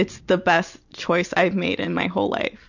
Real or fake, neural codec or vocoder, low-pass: real; none; 7.2 kHz